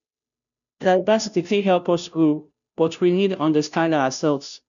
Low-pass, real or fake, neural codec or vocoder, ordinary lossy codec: 7.2 kHz; fake; codec, 16 kHz, 0.5 kbps, FunCodec, trained on Chinese and English, 25 frames a second; none